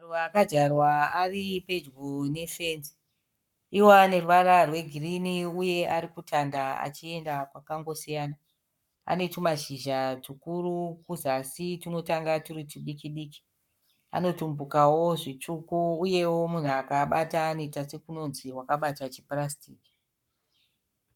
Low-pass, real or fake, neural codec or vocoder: 19.8 kHz; fake; codec, 44.1 kHz, 7.8 kbps, Pupu-Codec